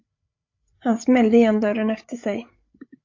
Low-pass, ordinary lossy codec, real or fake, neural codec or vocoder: 7.2 kHz; AAC, 48 kbps; fake; codec, 16 kHz, 8 kbps, FreqCodec, larger model